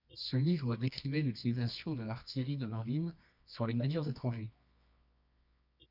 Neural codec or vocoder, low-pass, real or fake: codec, 24 kHz, 0.9 kbps, WavTokenizer, medium music audio release; 5.4 kHz; fake